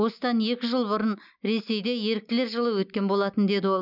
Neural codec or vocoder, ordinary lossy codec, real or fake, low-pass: none; none; real; 5.4 kHz